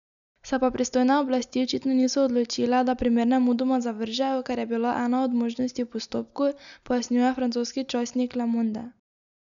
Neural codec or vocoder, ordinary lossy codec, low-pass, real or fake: none; none; 7.2 kHz; real